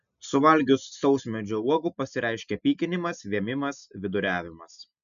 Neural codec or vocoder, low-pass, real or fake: none; 7.2 kHz; real